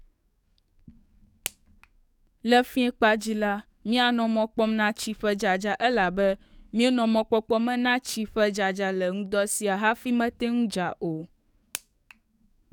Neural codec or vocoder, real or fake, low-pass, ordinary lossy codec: codec, 44.1 kHz, 7.8 kbps, DAC; fake; 19.8 kHz; none